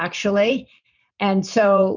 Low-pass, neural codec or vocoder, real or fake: 7.2 kHz; vocoder, 44.1 kHz, 128 mel bands every 512 samples, BigVGAN v2; fake